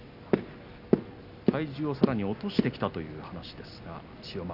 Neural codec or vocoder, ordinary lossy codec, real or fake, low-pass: none; none; real; 5.4 kHz